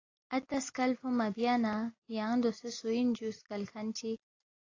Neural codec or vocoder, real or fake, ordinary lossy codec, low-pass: none; real; AAC, 32 kbps; 7.2 kHz